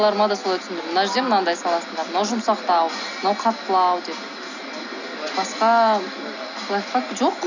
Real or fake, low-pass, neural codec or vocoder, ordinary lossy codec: real; 7.2 kHz; none; none